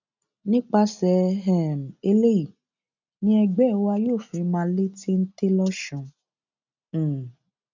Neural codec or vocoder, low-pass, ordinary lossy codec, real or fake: none; 7.2 kHz; none; real